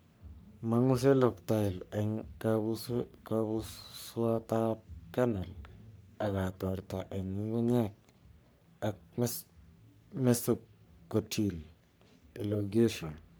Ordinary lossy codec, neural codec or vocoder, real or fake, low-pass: none; codec, 44.1 kHz, 3.4 kbps, Pupu-Codec; fake; none